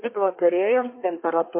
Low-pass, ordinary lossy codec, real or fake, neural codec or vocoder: 3.6 kHz; MP3, 24 kbps; fake; codec, 24 kHz, 1 kbps, SNAC